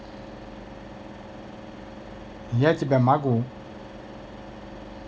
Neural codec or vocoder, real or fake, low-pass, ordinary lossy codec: none; real; none; none